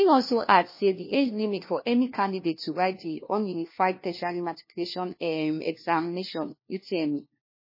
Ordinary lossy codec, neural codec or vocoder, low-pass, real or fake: MP3, 24 kbps; codec, 16 kHz, 1 kbps, FunCodec, trained on LibriTTS, 50 frames a second; 5.4 kHz; fake